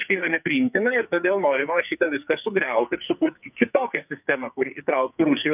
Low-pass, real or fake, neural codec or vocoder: 3.6 kHz; fake; codec, 44.1 kHz, 2.6 kbps, SNAC